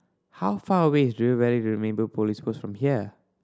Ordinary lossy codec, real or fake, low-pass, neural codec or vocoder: none; real; none; none